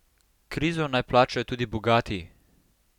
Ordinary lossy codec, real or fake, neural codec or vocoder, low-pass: none; real; none; 19.8 kHz